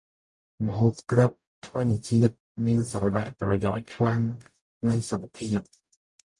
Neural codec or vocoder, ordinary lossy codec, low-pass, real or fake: codec, 44.1 kHz, 0.9 kbps, DAC; MP3, 64 kbps; 10.8 kHz; fake